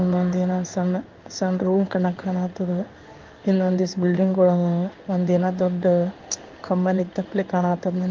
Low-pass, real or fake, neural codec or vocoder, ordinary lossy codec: 7.2 kHz; fake; codec, 16 kHz in and 24 kHz out, 1 kbps, XY-Tokenizer; Opus, 24 kbps